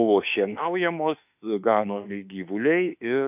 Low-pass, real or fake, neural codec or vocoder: 3.6 kHz; fake; autoencoder, 48 kHz, 32 numbers a frame, DAC-VAE, trained on Japanese speech